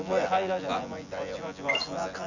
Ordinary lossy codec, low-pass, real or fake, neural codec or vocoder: none; 7.2 kHz; fake; vocoder, 24 kHz, 100 mel bands, Vocos